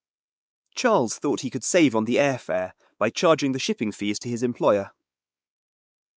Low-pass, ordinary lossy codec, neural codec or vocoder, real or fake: none; none; codec, 16 kHz, 4 kbps, X-Codec, WavLM features, trained on Multilingual LibriSpeech; fake